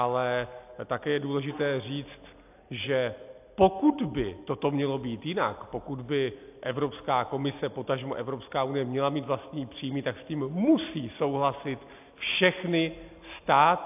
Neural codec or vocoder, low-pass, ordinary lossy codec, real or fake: none; 3.6 kHz; AAC, 32 kbps; real